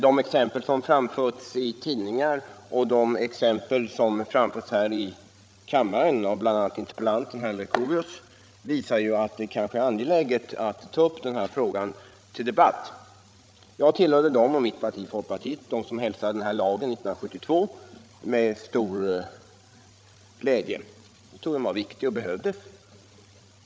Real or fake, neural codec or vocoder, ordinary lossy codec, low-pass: fake; codec, 16 kHz, 16 kbps, FreqCodec, larger model; none; none